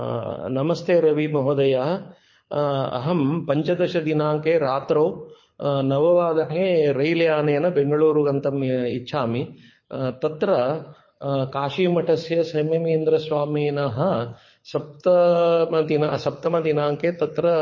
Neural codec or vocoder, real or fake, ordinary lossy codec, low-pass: codec, 24 kHz, 6 kbps, HILCodec; fake; MP3, 32 kbps; 7.2 kHz